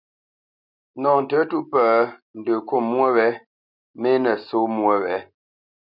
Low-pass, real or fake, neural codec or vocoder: 5.4 kHz; real; none